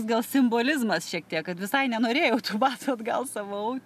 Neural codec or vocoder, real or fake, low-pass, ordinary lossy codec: none; real; 14.4 kHz; MP3, 96 kbps